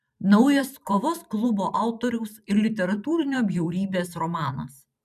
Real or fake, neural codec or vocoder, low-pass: fake; vocoder, 44.1 kHz, 128 mel bands every 512 samples, BigVGAN v2; 14.4 kHz